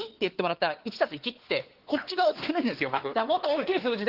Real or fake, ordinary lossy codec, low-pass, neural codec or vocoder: fake; Opus, 16 kbps; 5.4 kHz; codec, 16 kHz, 4 kbps, X-Codec, WavLM features, trained on Multilingual LibriSpeech